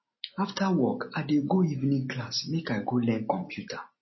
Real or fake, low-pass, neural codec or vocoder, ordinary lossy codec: real; 7.2 kHz; none; MP3, 24 kbps